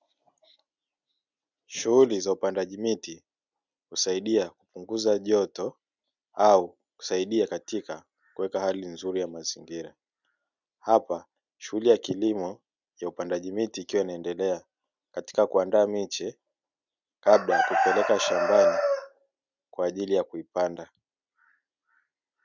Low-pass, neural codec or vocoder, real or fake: 7.2 kHz; none; real